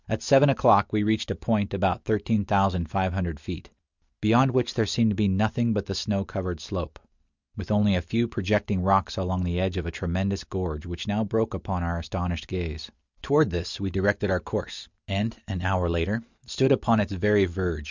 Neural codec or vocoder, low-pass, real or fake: none; 7.2 kHz; real